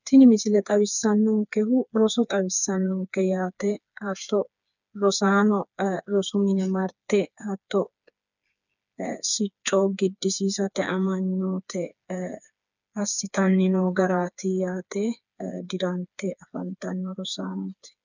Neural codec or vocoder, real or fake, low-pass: codec, 16 kHz, 4 kbps, FreqCodec, smaller model; fake; 7.2 kHz